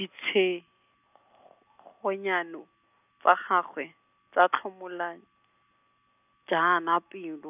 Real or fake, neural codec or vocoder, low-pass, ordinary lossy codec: real; none; 3.6 kHz; none